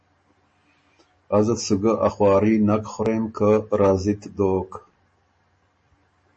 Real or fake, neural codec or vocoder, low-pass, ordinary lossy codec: real; none; 9.9 kHz; MP3, 32 kbps